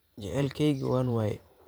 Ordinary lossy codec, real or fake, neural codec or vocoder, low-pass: none; fake; vocoder, 44.1 kHz, 128 mel bands every 512 samples, BigVGAN v2; none